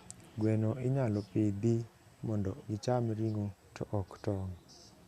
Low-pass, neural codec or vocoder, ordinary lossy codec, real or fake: 14.4 kHz; none; Opus, 64 kbps; real